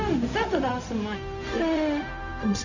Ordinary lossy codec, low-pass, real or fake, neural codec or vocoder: none; 7.2 kHz; fake; codec, 16 kHz, 0.4 kbps, LongCat-Audio-Codec